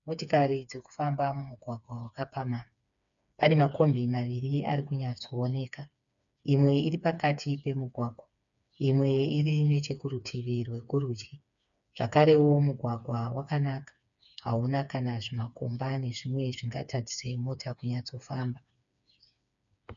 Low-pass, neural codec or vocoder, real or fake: 7.2 kHz; codec, 16 kHz, 4 kbps, FreqCodec, smaller model; fake